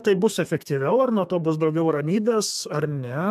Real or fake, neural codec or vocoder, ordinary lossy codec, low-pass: fake; codec, 32 kHz, 1.9 kbps, SNAC; AAC, 96 kbps; 14.4 kHz